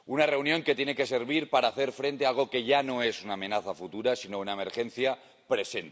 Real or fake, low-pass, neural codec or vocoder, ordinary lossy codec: real; none; none; none